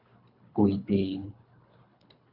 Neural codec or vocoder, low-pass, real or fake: codec, 24 kHz, 3 kbps, HILCodec; 5.4 kHz; fake